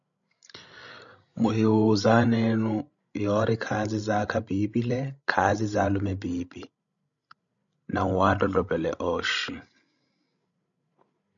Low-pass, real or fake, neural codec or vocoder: 7.2 kHz; fake; codec, 16 kHz, 16 kbps, FreqCodec, larger model